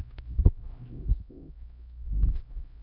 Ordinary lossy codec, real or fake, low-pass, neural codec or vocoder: AAC, 32 kbps; fake; 5.4 kHz; codec, 16 kHz, 0.5 kbps, X-Codec, HuBERT features, trained on LibriSpeech